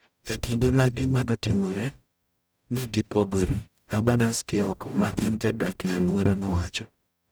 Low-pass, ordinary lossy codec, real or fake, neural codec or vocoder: none; none; fake; codec, 44.1 kHz, 0.9 kbps, DAC